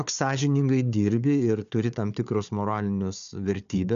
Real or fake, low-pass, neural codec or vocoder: fake; 7.2 kHz; codec, 16 kHz, 4 kbps, FunCodec, trained on Chinese and English, 50 frames a second